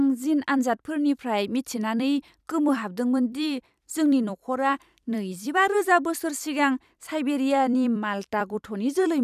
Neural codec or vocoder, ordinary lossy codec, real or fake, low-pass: vocoder, 44.1 kHz, 128 mel bands every 256 samples, BigVGAN v2; none; fake; 14.4 kHz